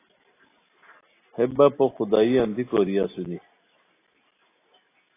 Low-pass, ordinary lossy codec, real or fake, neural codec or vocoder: 3.6 kHz; AAC, 24 kbps; real; none